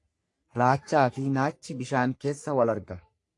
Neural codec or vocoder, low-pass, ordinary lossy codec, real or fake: codec, 44.1 kHz, 3.4 kbps, Pupu-Codec; 10.8 kHz; AAC, 48 kbps; fake